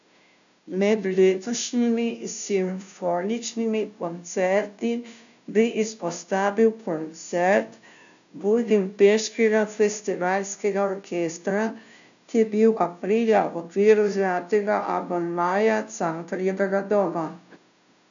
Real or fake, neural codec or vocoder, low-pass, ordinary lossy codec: fake; codec, 16 kHz, 0.5 kbps, FunCodec, trained on Chinese and English, 25 frames a second; 7.2 kHz; none